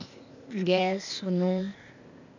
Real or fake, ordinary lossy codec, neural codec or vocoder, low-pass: fake; none; codec, 16 kHz, 0.8 kbps, ZipCodec; 7.2 kHz